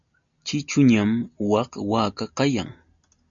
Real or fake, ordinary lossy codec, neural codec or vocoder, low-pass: real; MP3, 64 kbps; none; 7.2 kHz